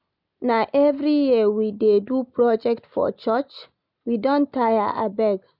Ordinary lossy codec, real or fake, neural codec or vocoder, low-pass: none; real; none; 5.4 kHz